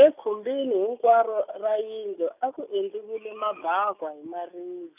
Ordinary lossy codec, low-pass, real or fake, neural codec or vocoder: none; 3.6 kHz; real; none